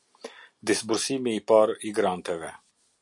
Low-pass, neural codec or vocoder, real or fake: 10.8 kHz; none; real